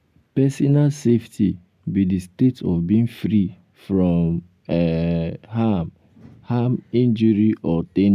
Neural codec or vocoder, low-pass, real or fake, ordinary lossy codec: none; 14.4 kHz; real; none